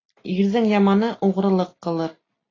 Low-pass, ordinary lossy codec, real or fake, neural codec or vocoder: 7.2 kHz; AAC, 32 kbps; real; none